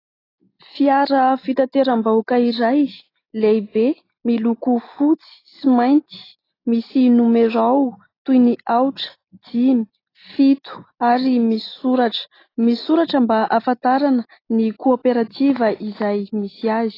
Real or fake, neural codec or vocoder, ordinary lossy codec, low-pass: real; none; AAC, 24 kbps; 5.4 kHz